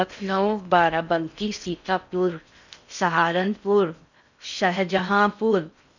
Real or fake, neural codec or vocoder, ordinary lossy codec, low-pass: fake; codec, 16 kHz in and 24 kHz out, 0.6 kbps, FocalCodec, streaming, 4096 codes; none; 7.2 kHz